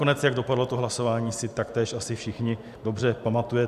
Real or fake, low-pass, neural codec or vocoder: real; 14.4 kHz; none